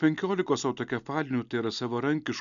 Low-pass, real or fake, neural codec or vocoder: 7.2 kHz; real; none